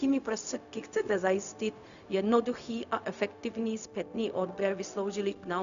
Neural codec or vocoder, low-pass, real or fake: codec, 16 kHz, 0.4 kbps, LongCat-Audio-Codec; 7.2 kHz; fake